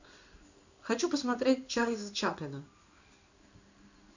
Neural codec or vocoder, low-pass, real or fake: codec, 16 kHz in and 24 kHz out, 1 kbps, XY-Tokenizer; 7.2 kHz; fake